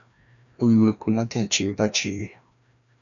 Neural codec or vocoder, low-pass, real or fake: codec, 16 kHz, 1 kbps, FreqCodec, larger model; 7.2 kHz; fake